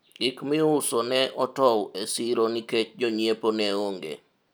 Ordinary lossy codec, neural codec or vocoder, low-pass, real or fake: none; none; none; real